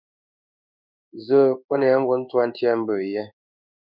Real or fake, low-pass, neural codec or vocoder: fake; 5.4 kHz; codec, 16 kHz in and 24 kHz out, 1 kbps, XY-Tokenizer